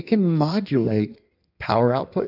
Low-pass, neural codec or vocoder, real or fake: 5.4 kHz; codec, 16 kHz in and 24 kHz out, 1.1 kbps, FireRedTTS-2 codec; fake